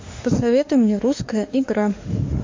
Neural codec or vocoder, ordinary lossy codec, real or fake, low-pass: autoencoder, 48 kHz, 32 numbers a frame, DAC-VAE, trained on Japanese speech; MP3, 48 kbps; fake; 7.2 kHz